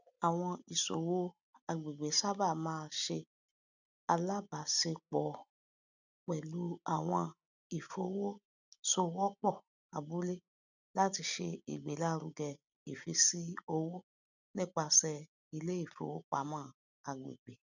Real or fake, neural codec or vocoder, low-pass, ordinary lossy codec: real; none; 7.2 kHz; none